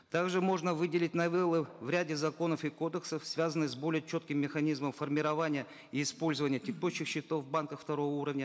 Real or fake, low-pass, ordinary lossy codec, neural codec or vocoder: real; none; none; none